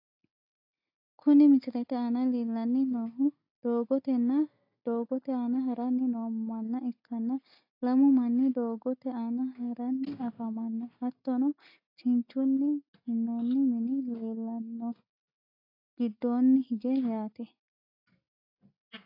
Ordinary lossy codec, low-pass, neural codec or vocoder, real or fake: AAC, 32 kbps; 5.4 kHz; none; real